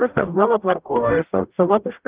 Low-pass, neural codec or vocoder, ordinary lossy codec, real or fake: 3.6 kHz; codec, 44.1 kHz, 0.9 kbps, DAC; Opus, 32 kbps; fake